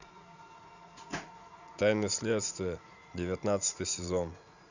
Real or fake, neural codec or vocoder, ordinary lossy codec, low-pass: fake; vocoder, 44.1 kHz, 80 mel bands, Vocos; none; 7.2 kHz